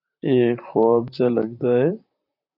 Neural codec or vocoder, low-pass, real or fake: vocoder, 44.1 kHz, 128 mel bands, Pupu-Vocoder; 5.4 kHz; fake